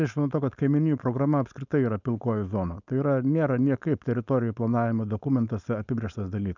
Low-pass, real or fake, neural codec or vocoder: 7.2 kHz; fake; codec, 16 kHz, 4.8 kbps, FACodec